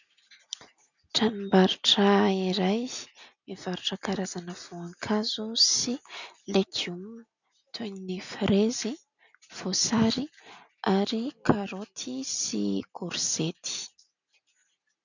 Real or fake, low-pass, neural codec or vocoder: real; 7.2 kHz; none